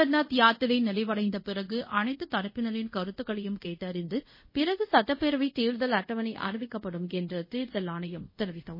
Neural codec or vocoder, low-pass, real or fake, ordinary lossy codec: codec, 24 kHz, 0.5 kbps, DualCodec; 5.4 kHz; fake; MP3, 24 kbps